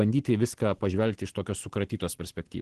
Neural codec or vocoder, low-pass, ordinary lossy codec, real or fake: none; 10.8 kHz; Opus, 16 kbps; real